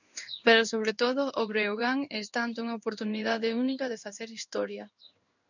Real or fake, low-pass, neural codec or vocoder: fake; 7.2 kHz; codec, 16 kHz in and 24 kHz out, 1 kbps, XY-Tokenizer